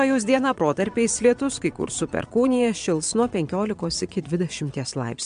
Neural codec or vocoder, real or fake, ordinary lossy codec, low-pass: vocoder, 22.05 kHz, 80 mel bands, Vocos; fake; MP3, 64 kbps; 9.9 kHz